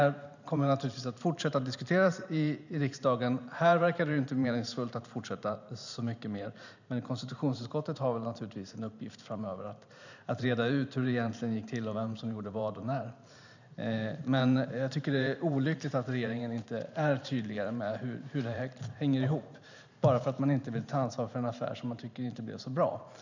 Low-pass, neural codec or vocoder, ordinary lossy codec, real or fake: 7.2 kHz; vocoder, 44.1 kHz, 128 mel bands every 512 samples, BigVGAN v2; none; fake